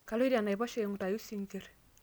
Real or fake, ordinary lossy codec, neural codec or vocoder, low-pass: real; none; none; none